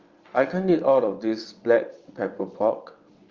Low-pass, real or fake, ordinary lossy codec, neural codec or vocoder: 7.2 kHz; fake; Opus, 32 kbps; vocoder, 22.05 kHz, 80 mel bands, WaveNeXt